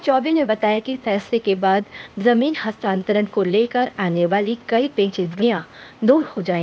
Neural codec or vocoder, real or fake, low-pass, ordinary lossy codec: codec, 16 kHz, 0.8 kbps, ZipCodec; fake; none; none